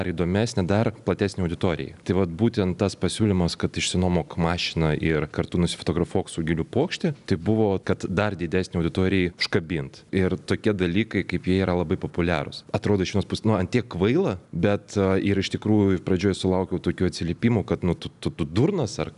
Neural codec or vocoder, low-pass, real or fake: none; 10.8 kHz; real